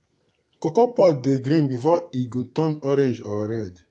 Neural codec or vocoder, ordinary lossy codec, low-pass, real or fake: codec, 32 kHz, 1.9 kbps, SNAC; AAC, 64 kbps; 10.8 kHz; fake